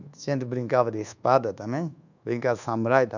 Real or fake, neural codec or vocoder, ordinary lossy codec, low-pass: fake; codec, 24 kHz, 1.2 kbps, DualCodec; none; 7.2 kHz